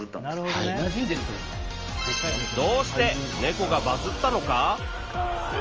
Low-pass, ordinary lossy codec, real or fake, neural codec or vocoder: 7.2 kHz; Opus, 24 kbps; real; none